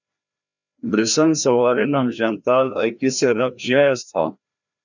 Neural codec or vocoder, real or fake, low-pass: codec, 16 kHz, 1 kbps, FreqCodec, larger model; fake; 7.2 kHz